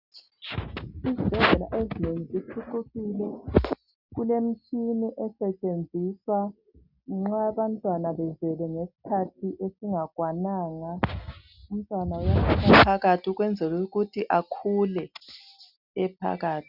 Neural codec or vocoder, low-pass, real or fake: none; 5.4 kHz; real